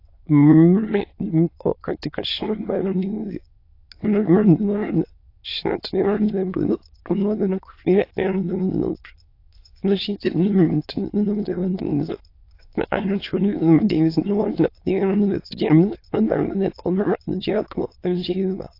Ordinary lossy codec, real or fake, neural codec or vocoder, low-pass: AAC, 32 kbps; fake; autoencoder, 22.05 kHz, a latent of 192 numbers a frame, VITS, trained on many speakers; 5.4 kHz